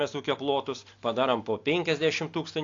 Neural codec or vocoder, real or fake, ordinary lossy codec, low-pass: none; real; AAC, 48 kbps; 7.2 kHz